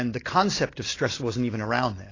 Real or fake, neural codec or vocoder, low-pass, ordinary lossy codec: real; none; 7.2 kHz; AAC, 32 kbps